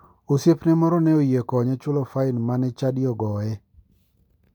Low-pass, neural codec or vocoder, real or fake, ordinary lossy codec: 19.8 kHz; none; real; none